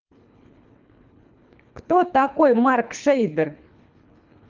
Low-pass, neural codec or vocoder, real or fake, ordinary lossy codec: 7.2 kHz; codec, 24 kHz, 3 kbps, HILCodec; fake; Opus, 24 kbps